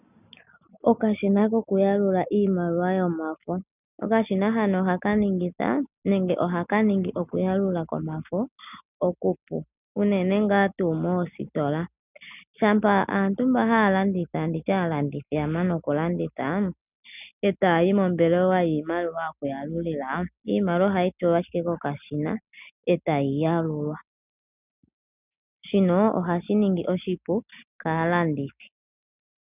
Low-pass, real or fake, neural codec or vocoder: 3.6 kHz; real; none